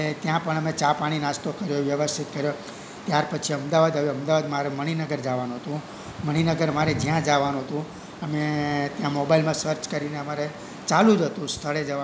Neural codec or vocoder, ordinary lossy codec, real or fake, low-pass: none; none; real; none